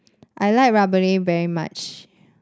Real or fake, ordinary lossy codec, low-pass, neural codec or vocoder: real; none; none; none